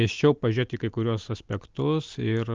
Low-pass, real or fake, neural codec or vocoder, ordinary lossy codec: 7.2 kHz; real; none; Opus, 32 kbps